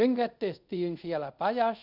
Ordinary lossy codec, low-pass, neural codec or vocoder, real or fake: none; 5.4 kHz; codec, 24 kHz, 0.5 kbps, DualCodec; fake